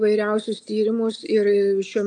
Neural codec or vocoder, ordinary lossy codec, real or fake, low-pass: none; AAC, 64 kbps; real; 9.9 kHz